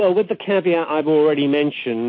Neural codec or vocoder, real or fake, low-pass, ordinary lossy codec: none; real; 7.2 kHz; MP3, 32 kbps